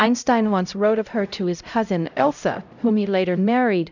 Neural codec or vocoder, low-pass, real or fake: codec, 16 kHz, 0.5 kbps, X-Codec, HuBERT features, trained on LibriSpeech; 7.2 kHz; fake